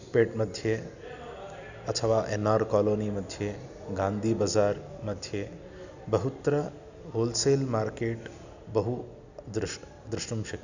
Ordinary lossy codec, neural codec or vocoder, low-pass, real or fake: none; none; 7.2 kHz; real